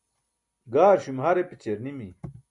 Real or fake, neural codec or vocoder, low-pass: real; none; 10.8 kHz